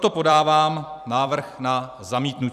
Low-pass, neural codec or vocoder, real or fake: 14.4 kHz; vocoder, 44.1 kHz, 128 mel bands every 256 samples, BigVGAN v2; fake